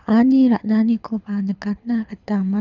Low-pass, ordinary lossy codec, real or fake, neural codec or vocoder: 7.2 kHz; none; fake; codec, 24 kHz, 6 kbps, HILCodec